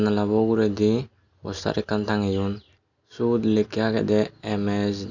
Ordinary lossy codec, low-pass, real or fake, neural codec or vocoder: none; 7.2 kHz; real; none